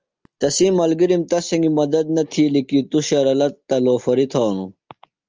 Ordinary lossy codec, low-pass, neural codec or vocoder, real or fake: Opus, 24 kbps; 7.2 kHz; none; real